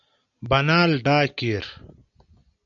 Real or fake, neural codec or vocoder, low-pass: real; none; 7.2 kHz